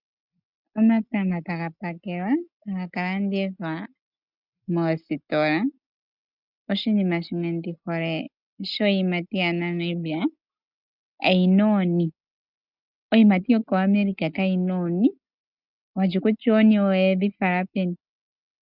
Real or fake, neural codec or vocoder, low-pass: real; none; 5.4 kHz